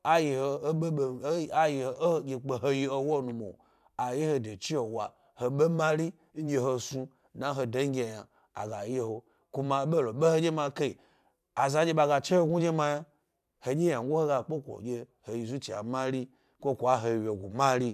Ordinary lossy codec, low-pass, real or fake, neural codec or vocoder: none; 14.4 kHz; fake; vocoder, 44.1 kHz, 128 mel bands every 512 samples, BigVGAN v2